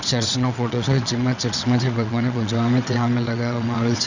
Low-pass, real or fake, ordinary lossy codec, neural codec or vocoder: 7.2 kHz; fake; none; vocoder, 22.05 kHz, 80 mel bands, WaveNeXt